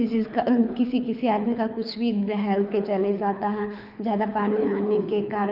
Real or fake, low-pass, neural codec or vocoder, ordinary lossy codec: fake; 5.4 kHz; codec, 24 kHz, 6 kbps, HILCodec; none